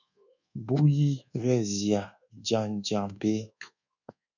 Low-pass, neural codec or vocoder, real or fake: 7.2 kHz; codec, 24 kHz, 1.2 kbps, DualCodec; fake